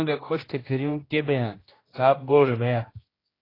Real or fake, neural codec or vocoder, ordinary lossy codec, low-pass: fake; codec, 16 kHz, 1 kbps, X-Codec, HuBERT features, trained on general audio; AAC, 24 kbps; 5.4 kHz